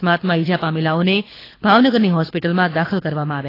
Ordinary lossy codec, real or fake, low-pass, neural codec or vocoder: AAC, 24 kbps; fake; 5.4 kHz; codec, 24 kHz, 6 kbps, HILCodec